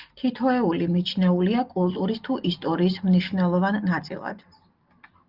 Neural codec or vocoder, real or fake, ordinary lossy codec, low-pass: none; real; Opus, 32 kbps; 5.4 kHz